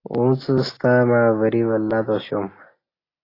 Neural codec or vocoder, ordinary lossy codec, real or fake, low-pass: none; AAC, 24 kbps; real; 5.4 kHz